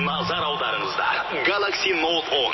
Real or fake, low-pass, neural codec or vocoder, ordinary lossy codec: real; 7.2 kHz; none; MP3, 24 kbps